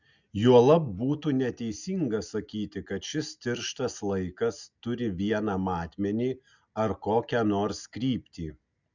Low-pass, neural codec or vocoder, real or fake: 7.2 kHz; none; real